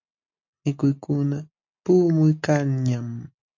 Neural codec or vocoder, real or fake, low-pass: none; real; 7.2 kHz